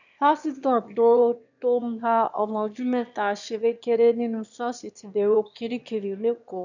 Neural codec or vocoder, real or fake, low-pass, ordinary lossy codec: autoencoder, 22.05 kHz, a latent of 192 numbers a frame, VITS, trained on one speaker; fake; 7.2 kHz; none